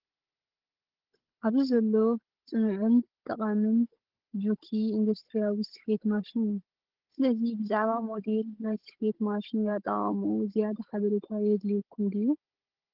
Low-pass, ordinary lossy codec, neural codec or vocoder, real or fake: 5.4 kHz; Opus, 16 kbps; codec, 16 kHz, 16 kbps, FunCodec, trained on Chinese and English, 50 frames a second; fake